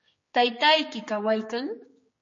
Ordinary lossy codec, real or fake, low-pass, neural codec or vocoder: MP3, 32 kbps; fake; 7.2 kHz; codec, 16 kHz, 4 kbps, X-Codec, HuBERT features, trained on general audio